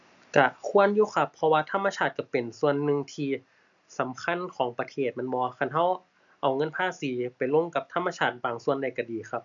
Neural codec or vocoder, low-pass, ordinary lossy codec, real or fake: none; 7.2 kHz; none; real